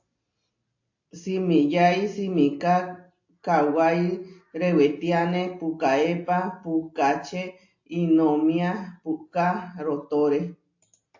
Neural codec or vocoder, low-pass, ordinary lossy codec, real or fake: none; 7.2 kHz; AAC, 48 kbps; real